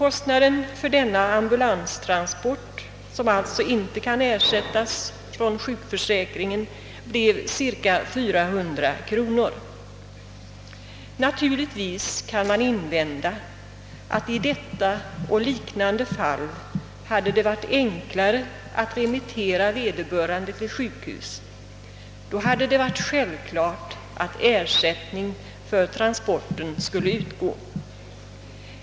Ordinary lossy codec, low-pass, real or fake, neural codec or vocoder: none; none; real; none